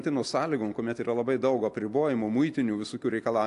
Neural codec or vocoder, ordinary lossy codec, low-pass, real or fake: none; AAC, 64 kbps; 10.8 kHz; real